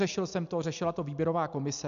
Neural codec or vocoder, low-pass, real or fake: none; 7.2 kHz; real